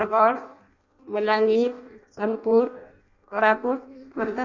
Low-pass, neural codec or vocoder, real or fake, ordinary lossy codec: 7.2 kHz; codec, 16 kHz in and 24 kHz out, 0.6 kbps, FireRedTTS-2 codec; fake; Opus, 64 kbps